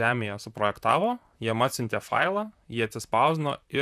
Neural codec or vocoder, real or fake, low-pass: vocoder, 44.1 kHz, 128 mel bands, Pupu-Vocoder; fake; 14.4 kHz